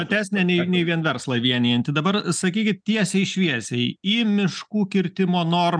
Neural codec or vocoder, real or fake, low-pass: none; real; 9.9 kHz